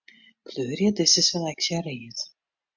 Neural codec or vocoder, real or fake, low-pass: none; real; 7.2 kHz